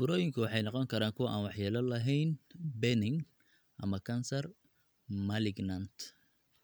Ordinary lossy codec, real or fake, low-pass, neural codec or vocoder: none; real; none; none